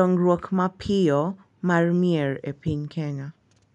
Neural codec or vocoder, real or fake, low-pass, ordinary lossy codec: none; real; 10.8 kHz; none